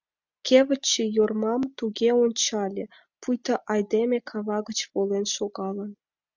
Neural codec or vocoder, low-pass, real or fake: none; 7.2 kHz; real